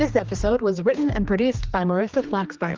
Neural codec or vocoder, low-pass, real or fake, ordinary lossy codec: codec, 16 kHz, 2 kbps, X-Codec, HuBERT features, trained on general audio; 7.2 kHz; fake; Opus, 24 kbps